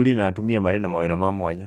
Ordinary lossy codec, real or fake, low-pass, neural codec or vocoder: none; fake; 19.8 kHz; codec, 44.1 kHz, 2.6 kbps, DAC